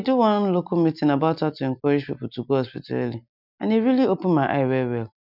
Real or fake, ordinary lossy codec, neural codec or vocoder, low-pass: real; none; none; 5.4 kHz